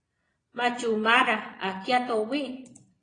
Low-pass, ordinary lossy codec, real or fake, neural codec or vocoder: 9.9 kHz; AAC, 32 kbps; fake; vocoder, 22.05 kHz, 80 mel bands, Vocos